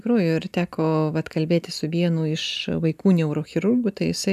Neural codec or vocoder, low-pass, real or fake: none; 14.4 kHz; real